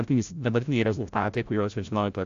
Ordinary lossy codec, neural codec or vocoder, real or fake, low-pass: AAC, 64 kbps; codec, 16 kHz, 0.5 kbps, FreqCodec, larger model; fake; 7.2 kHz